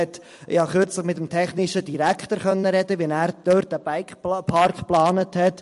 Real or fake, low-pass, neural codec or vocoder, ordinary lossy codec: fake; 14.4 kHz; vocoder, 44.1 kHz, 128 mel bands every 256 samples, BigVGAN v2; MP3, 48 kbps